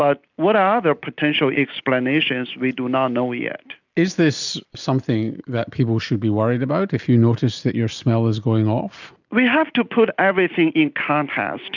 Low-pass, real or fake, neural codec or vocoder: 7.2 kHz; real; none